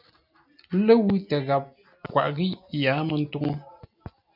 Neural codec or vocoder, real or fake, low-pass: none; real; 5.4 kHz